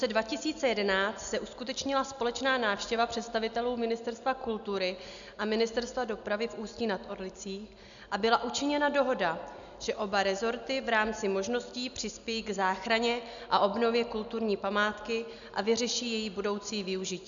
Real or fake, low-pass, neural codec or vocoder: real; 7.2 kHz; none